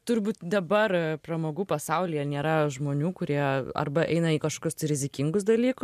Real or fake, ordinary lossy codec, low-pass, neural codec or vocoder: real; MP3, 96 kbps; 14.4 kHz; none